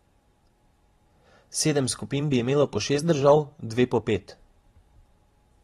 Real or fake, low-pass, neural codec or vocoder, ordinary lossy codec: fake; 19.8 kHz; vocoder, 44.1 kHz, 128 mel bands every 512 samples, BigVGAN v2; AAC, 32 kbps